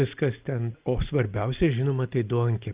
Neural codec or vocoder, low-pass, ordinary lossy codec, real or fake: none; 3.6 kHz; Opus, 24 kbps; real